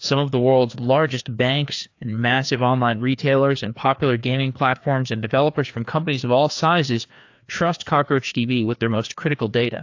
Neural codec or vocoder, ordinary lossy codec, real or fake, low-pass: codec, 16 kHz, 2 kbps, FreqCodec, larger model; AAC, 48 kbps; fake; 7.2 kHz